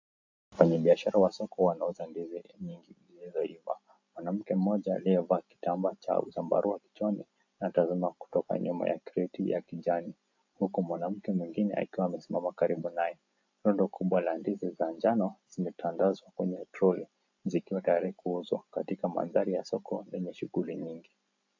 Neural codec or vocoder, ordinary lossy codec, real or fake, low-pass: none; AAC, 48 kbps; real; 7.2 kHz